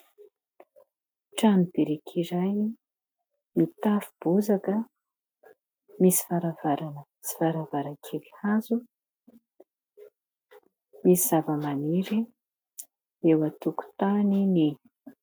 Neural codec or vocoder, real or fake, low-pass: none; real; 19.8 kHz